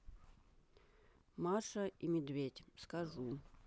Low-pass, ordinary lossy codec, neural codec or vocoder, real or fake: none; none; none; real